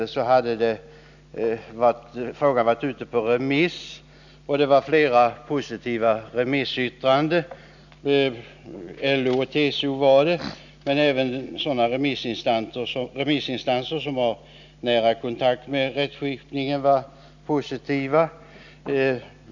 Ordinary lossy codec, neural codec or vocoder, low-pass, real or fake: none; none; 7.2 kHz; real